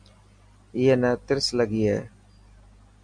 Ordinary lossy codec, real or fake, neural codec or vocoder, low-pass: MP3, 96 kbps; real; none; 9.9 kHz